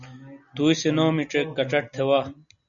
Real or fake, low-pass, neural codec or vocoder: real; 7.2 kHz; none